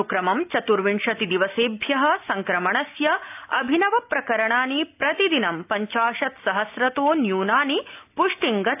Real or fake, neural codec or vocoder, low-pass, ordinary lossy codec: real; none; 3.6 kHz; AAC, 32 kbps